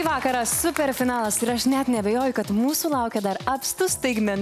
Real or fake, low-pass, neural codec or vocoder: real; 14.4 kHz; none